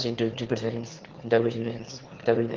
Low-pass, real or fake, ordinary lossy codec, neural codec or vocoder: 7.2 kHz; fake; Opus, 16 kbps; autoencoder, 22.05 kHz, a latent of 192 numbers a frame, VITS, trained on one speaker